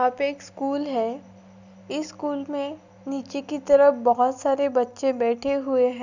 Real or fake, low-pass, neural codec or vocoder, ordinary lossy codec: real; 7.2 kHz; none; none